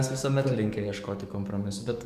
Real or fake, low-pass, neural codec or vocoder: fake; 14.4 kHz; codec, 44.1 kHz, 7.8 kbps, DAC